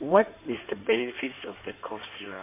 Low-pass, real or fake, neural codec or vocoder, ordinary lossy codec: 3.6 kHz; fake; codec, 16 kHz in and 24 kHz out, 2.2 kbps, FireRedTTS-2 codec; MP3, 24 kbps